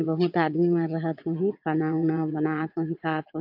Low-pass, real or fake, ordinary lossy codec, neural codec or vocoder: 5.4 kHz; fake; AAC, 48 kbps; vocoder, 22.05 kHz, 80 mel bands, WaveNeXt